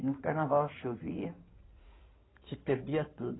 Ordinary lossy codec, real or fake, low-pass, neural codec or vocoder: AAC, 16 kbps; fake; 7.2 kHz; codec, 16 kHz in and 24 kHz out, 2.2 kbps, FireRedTTS-2 codec